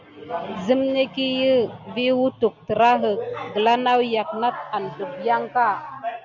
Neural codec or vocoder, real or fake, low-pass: none; real; 7.2 kHz